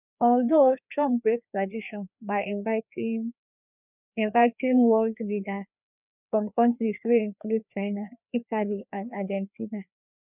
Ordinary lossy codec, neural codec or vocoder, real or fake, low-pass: none; codec, 16 kHz, 2 kbps, FreqCodec, larger model; fake; 3.6 kHz